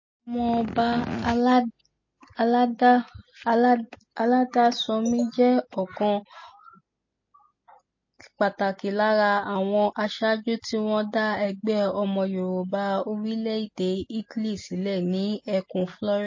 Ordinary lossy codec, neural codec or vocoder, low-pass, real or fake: MP3, 32 kbps; none; 7.2 kHz; real